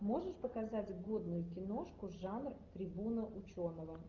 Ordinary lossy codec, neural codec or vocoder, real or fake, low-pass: Opus, 24 kbps; none; real; 7.2 kHz